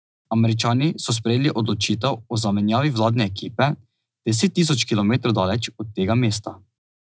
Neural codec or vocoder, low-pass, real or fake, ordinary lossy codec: none; none; real; none